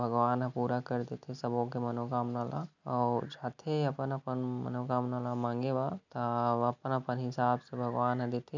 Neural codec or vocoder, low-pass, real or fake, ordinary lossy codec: none; 7.2 kHz; real; none